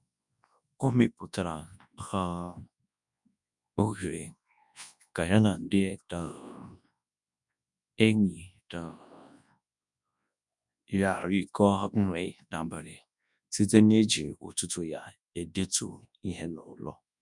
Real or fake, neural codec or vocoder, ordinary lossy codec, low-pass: fake; codec, 24 kHz, 0.9 kbps, WavTokenizer, large speech release; none; 10.8 kHz